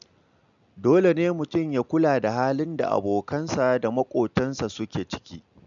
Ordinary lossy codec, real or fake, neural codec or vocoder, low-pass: none; real; none; 7.2 kHz